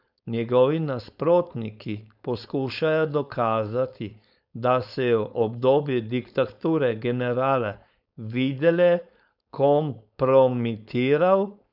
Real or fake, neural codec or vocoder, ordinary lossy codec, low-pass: fake; codec, 16 kHz, 4.8 kbps, FACodec; none; 5.4 kHz